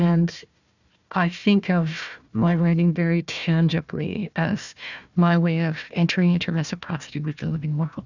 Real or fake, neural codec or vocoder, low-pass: fake; codec, 16 kHz, 1 kbps, FunCodec, trained on Chinese and English, 50 frames a second; 7.2 kHz